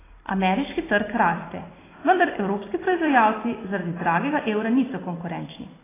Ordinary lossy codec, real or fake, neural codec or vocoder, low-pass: AAC, 16 kbps; fake; vocoder, 44.1 kHz, 128 mel bands every 256 samples, BigVGAN v2; 3.6 kHz